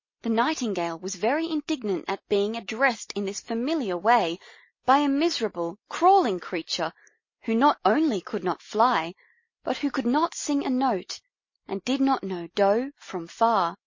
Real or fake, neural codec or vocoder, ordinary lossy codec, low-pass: real; none; MP3, 32 kbps; 7.2 kHz